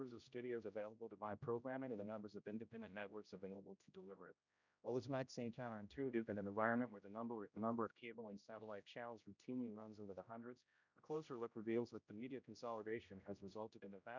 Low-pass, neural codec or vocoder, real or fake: 7.2 kHz; codec, 16 kHz, 0.5 kbps, X-Codec, HuBERT features, trained on general audio; fake